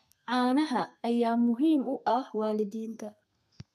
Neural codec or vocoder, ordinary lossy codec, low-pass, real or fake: codec, 32 kHz, 1.9 kbps, SNAC; none; 14.4 kHz; fake